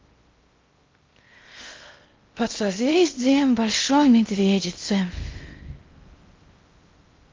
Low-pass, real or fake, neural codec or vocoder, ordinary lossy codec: 7.2 kHz; fake; codec, 16 kHz in and 24 kHz out, 0.6 kbps, FocalCodec, streaming, 4096 codes; Opus, 24 kbps